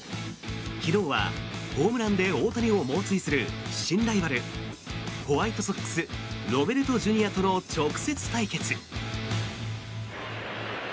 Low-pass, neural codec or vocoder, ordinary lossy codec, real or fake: none; none; none; real